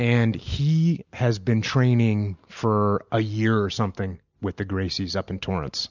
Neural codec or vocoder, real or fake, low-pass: none; real; 7.2 kHz